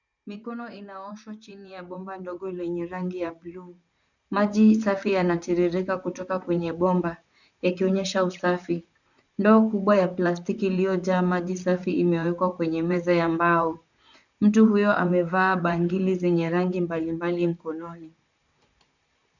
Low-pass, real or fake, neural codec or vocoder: 7.2 kHz; fake; vocoder, 44.1 kHz, 128 mel bands, Pupu-Vocoder